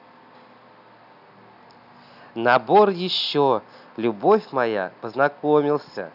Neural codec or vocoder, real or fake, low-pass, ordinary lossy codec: none; real; 5.4 kHz; none